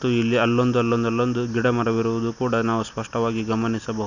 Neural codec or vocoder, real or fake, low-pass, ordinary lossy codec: none; real; 7.2 kHz; none